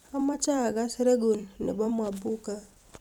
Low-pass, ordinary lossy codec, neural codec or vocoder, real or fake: 19.8 kHz; none; vocoder, 44.1 kHz, 128 mel bands every 256 samples, BigVGAN v2; fake